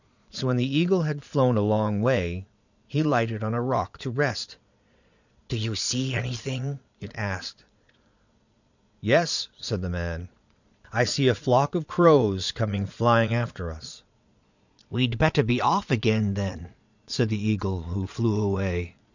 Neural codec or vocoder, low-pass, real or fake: vocoder, 22.05 kHz, 80 mel bands, Vocos; 7.2 kHz; fake